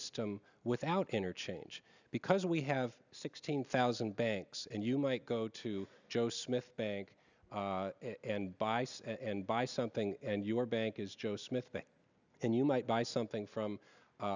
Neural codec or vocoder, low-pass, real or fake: none; 7.2 kHz; real